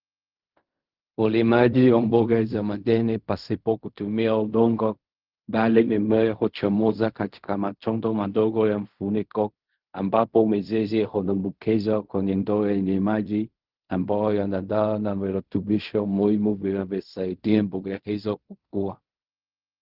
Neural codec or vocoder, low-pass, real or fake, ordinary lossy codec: codec, 16 kHz in and 24 kHz out, 0.4 kbps, LongCat-Audio-Codec, fine tuned four codebook decoder; 5.4 kHz; fake; Opus, 32 kbps